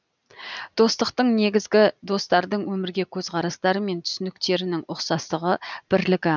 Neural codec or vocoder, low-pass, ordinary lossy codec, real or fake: none; 7.2 kHz; none; real